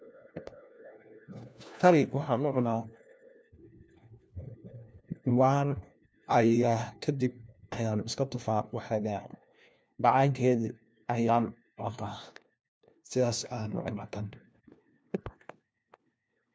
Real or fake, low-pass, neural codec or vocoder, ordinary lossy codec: fake; none; codec, 16 kHz, 1 kbps, FunCodec, trained on LibriTTS, 50 frames a second; none